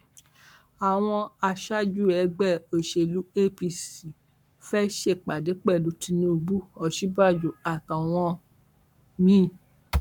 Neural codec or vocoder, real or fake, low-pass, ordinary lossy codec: codec, 44.1 kHz, 7.8 kbps, Pupu-Codec; fake; 19.8 kHz; none